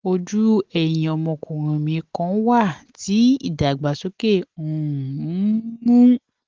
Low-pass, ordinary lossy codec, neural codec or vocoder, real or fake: 7.2 kHz; Opus, 16 kbps; none; real